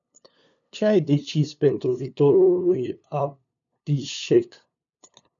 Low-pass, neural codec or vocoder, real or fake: 7.2 kHz; codec, 16 kHz, 2 kbps, FunCodec, trained on LibriTTS, 25 frames a second; fake